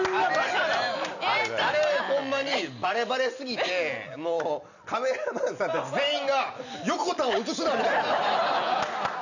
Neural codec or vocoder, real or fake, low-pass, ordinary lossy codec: none; real; 7.2 kHz; none